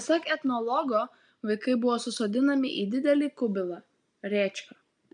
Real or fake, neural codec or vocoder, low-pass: real; none; 9.9 kHz